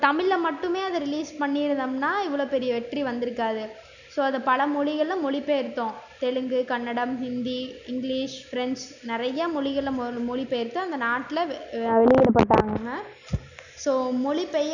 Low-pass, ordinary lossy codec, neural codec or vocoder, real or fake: 7.2 kHz; none; none; real